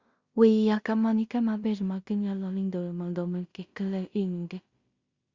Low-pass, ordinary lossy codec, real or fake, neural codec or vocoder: 7.2 kHz; Opus, 64 kbps; fake; codec, 16 kHz in and 24 kHz out, 0.4 kbps, LongCat-Audio-Codec, two codebook decoder